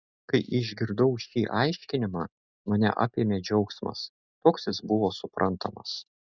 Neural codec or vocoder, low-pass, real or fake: none; 7.2 kHz; real